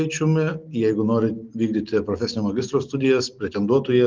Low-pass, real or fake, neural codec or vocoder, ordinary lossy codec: 7.2 kHz; real; none; Opus, 24 kbps